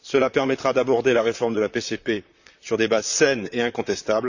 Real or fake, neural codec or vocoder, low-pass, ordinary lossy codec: fake; vocoder, 44.1 kHz, 128 mel bands, Pupu-Vocoder; 7.2 kHz; none